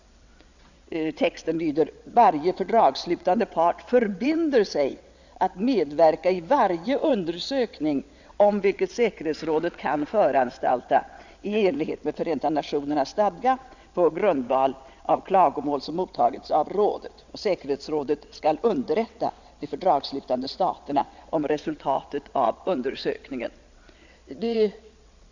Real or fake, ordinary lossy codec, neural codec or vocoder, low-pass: fake; Opus, 64 kbps; vocoder, 22.05 kHz, 80 mel bands, WaveNeXt; 7.2 kHz